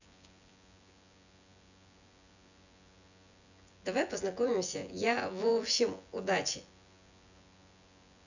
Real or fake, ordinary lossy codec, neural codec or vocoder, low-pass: fake; none; vocoder, 24 kHz, 100 mel bands, Vocos; 7.2 kHz